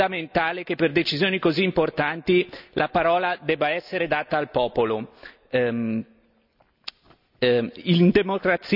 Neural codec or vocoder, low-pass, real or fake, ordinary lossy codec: none; 5.4 kHz; real; none